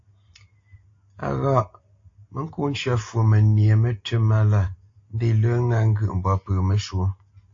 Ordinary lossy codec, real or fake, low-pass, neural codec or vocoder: AAC, 48 kbps; real; 7.2 kHz; none